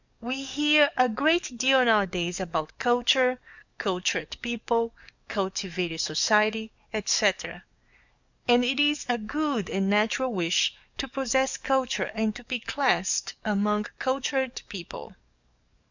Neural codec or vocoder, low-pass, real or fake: codec, 44.1 kHz, 7.8 kbps, DAC; 7.2 kHz; fake